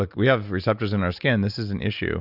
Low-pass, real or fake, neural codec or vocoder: 5.4 kHz; real; none